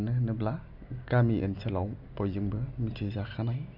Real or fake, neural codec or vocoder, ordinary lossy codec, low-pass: real; none; none; 5.4 kHz